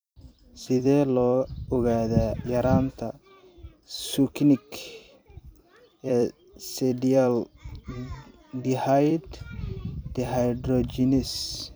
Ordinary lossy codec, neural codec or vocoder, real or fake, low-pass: none; vocoder, 44.1 kHz, 128 mel bands every 256 samples, BigVGAN v2; fake; none